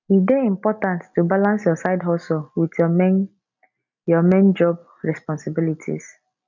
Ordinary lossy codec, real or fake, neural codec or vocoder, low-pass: none; real; none; 7.2 kHz